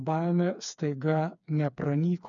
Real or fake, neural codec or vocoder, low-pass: fake; codec, 16 kHz, 4 kbps, FreqCodec, smaller model; 7.2 kHz